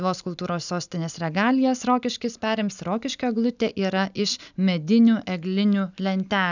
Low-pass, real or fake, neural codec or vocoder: 7.2 kHz; real; none